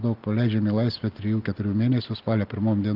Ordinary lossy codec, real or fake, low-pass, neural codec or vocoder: Opus, 24 kbps; real; 5.4 kHz; none